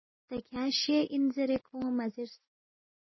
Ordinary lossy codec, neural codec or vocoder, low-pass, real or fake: MP3, 24 kbps; none; 7.2 kHz; real